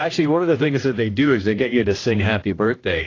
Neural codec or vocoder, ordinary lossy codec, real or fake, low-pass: codec, 16 kHz, 1 kbps, X-Codec, HuBERT features, trained on general audio; AAC, 32 kbps; fake; 7.2 kHz